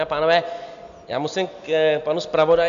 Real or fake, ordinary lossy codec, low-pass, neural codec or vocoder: real; MP3, 64 kbps; 7.2 kHz; none